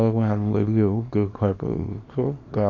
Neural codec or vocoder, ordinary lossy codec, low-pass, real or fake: codec, 24 kHz, 0.9 kbps, WavTokenizer, small release; MP3, 48 kbps; 7.2 kHz; fake